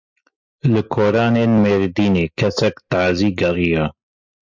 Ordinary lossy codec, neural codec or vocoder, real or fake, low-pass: MP3, 64 kbps; none; real; 7.2 kHz